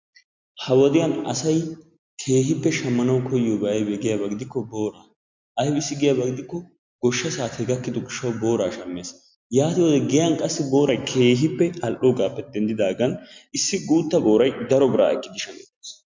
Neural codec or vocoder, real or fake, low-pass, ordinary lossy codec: none; real; 7.2 kHz; MP3, 64 kbps